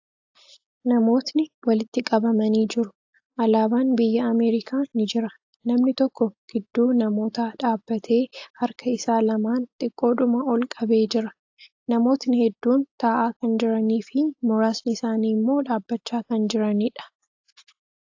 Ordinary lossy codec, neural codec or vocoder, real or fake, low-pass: AAC, 48 kbps; none; real; 7.2 kHz